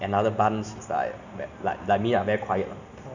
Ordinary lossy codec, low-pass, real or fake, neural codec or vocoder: none; 7.2 kHz; real; none